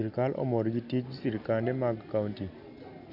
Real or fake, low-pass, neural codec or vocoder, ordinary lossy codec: real; 5.4 kHz; none; none